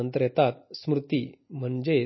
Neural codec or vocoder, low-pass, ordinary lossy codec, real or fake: none; 7.2 kHz; MP3, 24 kbps; real